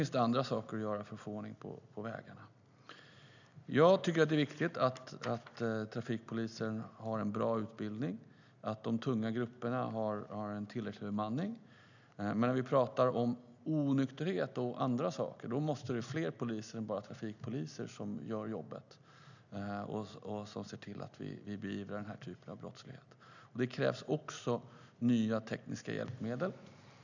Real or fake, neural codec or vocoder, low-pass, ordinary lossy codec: real; none; 7.2 kHz; none